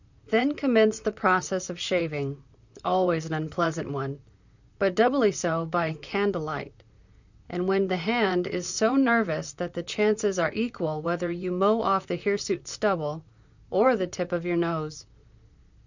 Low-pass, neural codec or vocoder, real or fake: 7.2 kHz; vocoder, 44.1 kHz, 128 mel bands, Pupu-Vocoder; fake